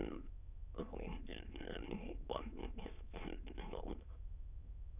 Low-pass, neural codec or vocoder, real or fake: 3.6 kHz; autoencoder, 22.05 kHz, a latent of 192 numbers a frame, VITS, trained on many speakers; fake